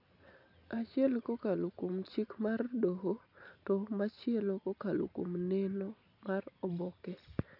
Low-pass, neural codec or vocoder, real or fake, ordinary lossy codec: 5.4 kHz; none; real; none